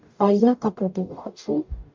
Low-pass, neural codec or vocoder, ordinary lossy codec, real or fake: 7.2 kHz; codec, 44.1 kHz, 0.9 kbps, DAC; MP3, 64 kbps; fake